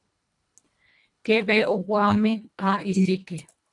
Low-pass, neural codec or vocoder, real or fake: 10.8 kHz; codec, 24 kHz, 1.5 kbps, HILCodec; fake